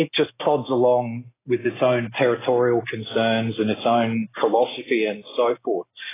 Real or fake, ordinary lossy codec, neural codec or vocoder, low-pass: real; AAC, 16 kbps; none; 3.6 kHz